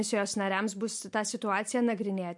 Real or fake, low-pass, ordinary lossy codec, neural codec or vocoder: real; 10.8 kHz; MP3, 64 kbps; none